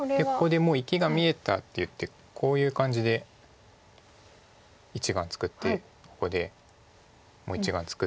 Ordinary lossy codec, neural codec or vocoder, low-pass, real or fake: none; none; none; real